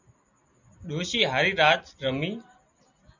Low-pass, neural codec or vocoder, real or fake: 7.2 kHz; none; real